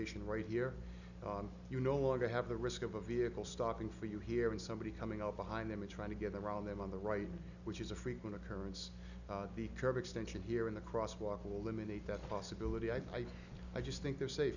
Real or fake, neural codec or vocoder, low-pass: real; none; 7.2 kHz